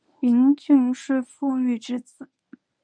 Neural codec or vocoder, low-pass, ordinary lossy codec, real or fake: codec, 24 kHz, 0.9 kbps, WavTokenizer, medium speech release version 1; 9.9 kHz; MP3, 64 kbps; fake